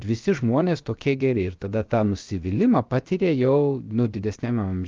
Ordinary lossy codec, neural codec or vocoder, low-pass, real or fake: Opus, 32 kbps; codec, 16 kHz, about 1 kbps, DyCAST, with the encoder's durations; 7.2 kHz; fake